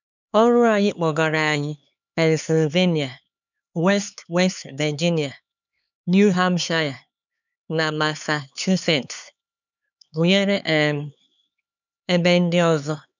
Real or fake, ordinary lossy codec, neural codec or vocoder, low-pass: fake; none; codec, 16 kHz, 4 kbps, X-Codec, HuBERT features, trained on LibriSpeech; 7.2 kHz